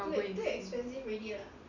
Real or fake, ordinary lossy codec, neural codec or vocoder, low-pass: real; none; none; 7.2 kHz